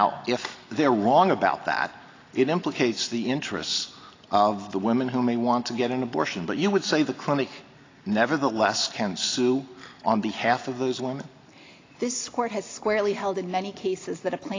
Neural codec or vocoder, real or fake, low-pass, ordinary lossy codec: none; real; 7.2 kHz; AAC, 32 kbps